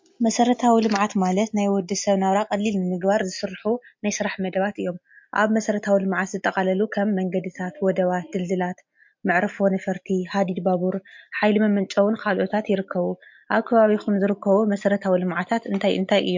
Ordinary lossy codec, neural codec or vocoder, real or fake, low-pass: MP3, 48 kbps; none; real; 7.2 kHz